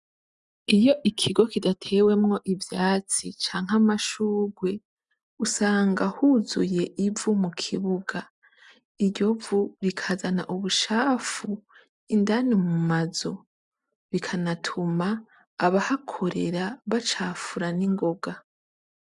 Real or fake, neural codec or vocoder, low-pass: real; none; 10.8 kHz